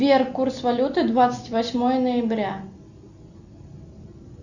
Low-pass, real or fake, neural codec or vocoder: 7.2 kHz; real; none